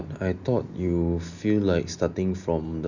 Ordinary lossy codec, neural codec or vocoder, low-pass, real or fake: none; none; 7.2 kHz; real